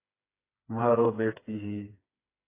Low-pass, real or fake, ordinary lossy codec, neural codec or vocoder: 3.6 kHz; fake; AAC, 24 kbps; codec, 16 kHz, 4 kbps, FreqCodec, smaller model